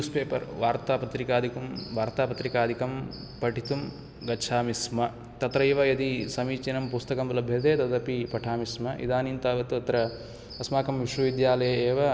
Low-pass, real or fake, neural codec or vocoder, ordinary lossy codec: none; real; none; none